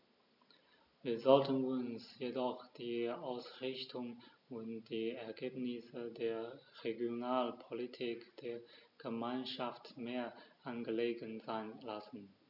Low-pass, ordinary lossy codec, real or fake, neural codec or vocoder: 5.4 kHz; none; real; none